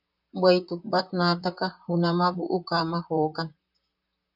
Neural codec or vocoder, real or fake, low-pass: vocoder, 44.1 kHz, 128 mel bands, Pupu-Vocoder; fake; 5.4 kHz